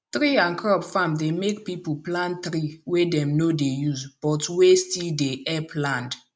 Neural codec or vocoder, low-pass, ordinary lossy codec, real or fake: none; none; none; real